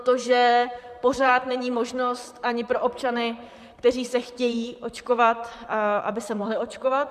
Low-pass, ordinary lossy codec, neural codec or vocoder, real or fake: 14.4 kHz; MP3, 96 kbps; vocoder, 44.1 kHz, 128 mel bands, Pupu-Vocoder; fake